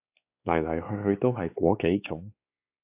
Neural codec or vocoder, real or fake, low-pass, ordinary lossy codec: codec, 16 kHz, 4 kbps, X-Codec, WavLM features, trained on Multilingual LibriSpeech; fake; 3.6 kHz; AAC, 32 kbps